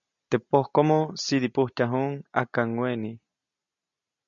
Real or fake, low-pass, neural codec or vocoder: real; 7.2 kHz; none